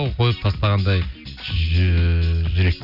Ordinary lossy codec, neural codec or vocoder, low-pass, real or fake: none; none; 5.4 kHz; real